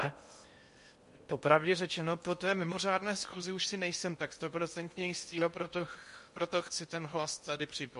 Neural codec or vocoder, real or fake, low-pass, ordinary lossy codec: codec, 16 kHz in and 24 kHz out, 0.8 kbps, FocalCodec, streaming, 65536 codes; fake; 10.8 kHz; MP3, 48 kbps